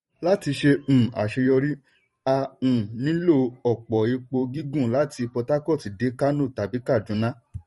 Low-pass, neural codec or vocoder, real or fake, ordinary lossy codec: 19.8 kHz; vocoder, 44.1 kHz, 128 mel bands every 512 samples, BigVGAN v2; fake; MP3, 48 kbps